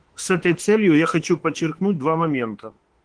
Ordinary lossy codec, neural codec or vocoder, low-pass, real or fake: Opus, 16 kbps; autoencoder, 48 kHz, 32 numbers a frame, DAC-VAE, trained on Japanese speech; 9.9 kHz; fake